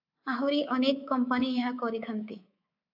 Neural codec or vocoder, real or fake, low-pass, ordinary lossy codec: codec, 24 kHz, 3.1 kbps, DualCodec; fake; 5.4 kHz; AAC, 48 kbps